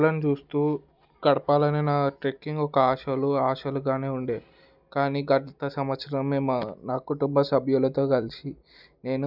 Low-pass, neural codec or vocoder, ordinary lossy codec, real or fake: 5.4 kHz; none; none; real